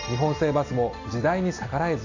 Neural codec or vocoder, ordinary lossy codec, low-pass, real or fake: none; AAC, 48 kbps; 7.2 kHz; real